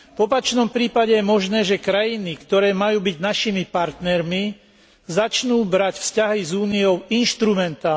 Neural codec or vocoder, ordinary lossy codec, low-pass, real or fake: none; none; none; real